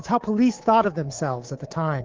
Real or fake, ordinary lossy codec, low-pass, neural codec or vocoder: fake; Opus, 16 kbps; 7.2 kHz; codec, 24 kHz, 3.1 kbps, DualCodec